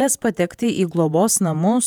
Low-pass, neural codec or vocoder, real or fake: 19.8 kHz; vocoder, 48 kHz, 128 mel bands, Vocos; fake